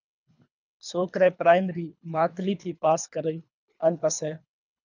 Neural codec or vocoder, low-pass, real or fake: codec, 24 kHz, 3 kbps, HILCodec; 7.2 kHz; fake